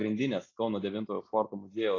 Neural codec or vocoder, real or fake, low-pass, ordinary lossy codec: none; real; 7.2 kHz; AAC, 32 kbps